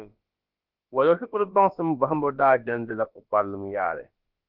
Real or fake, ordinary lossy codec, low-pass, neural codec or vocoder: fake; Opus, 32 kbps; 5.4 kHz; codec, 16 kHz, about 1 kbps, DyCAST, with the encoder's durations